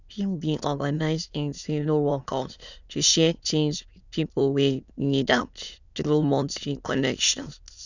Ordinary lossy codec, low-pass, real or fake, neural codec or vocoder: none; 7.2 kHz; fake; autoencoder, 22.05 kHz, a latent of 192 numbers a frame, VITS, trained on many speakers